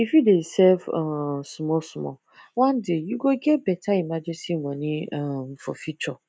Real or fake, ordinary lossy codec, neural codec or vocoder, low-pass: real; none; none; none